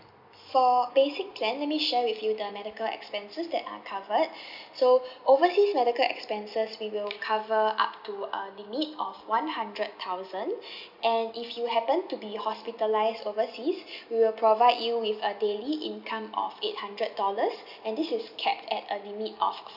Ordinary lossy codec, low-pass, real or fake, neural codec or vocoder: none; 5.4 kHz; real; none